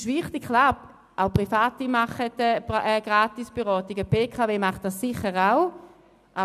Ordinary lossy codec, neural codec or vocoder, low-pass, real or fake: none; none; 14.4 kHz; real